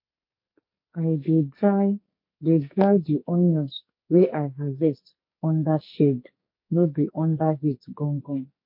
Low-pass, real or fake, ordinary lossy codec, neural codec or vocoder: 5.4 kHz; fake; MP3, 32 kbps; codec, 44.1 kHz, 2.6 kbps, SNAC